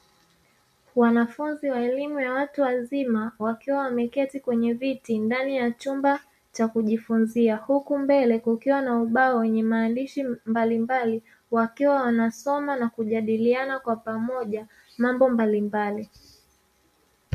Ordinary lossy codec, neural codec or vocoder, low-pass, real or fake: MP3, 64 kbps; none; 14.4 kHz; real